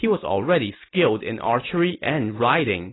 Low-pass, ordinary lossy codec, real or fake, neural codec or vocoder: 7.2 kHz; AAC, 16 kbps; real; none